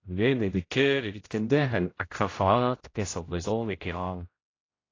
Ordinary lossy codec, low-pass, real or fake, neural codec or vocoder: AAC, 32 kbps; 7.2 kHz; fake; codec, 16 kHz, 0.5 kbps, X-Codec, HuBERT features, trained on general audio